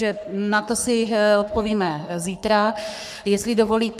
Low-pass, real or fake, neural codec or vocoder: 14.4 kHz; fake; codec, 44.1 kHz, 3.4 kbps, Pupu-Codec